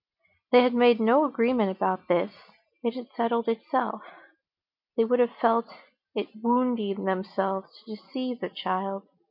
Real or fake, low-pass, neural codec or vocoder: real; 5.4 kHz; none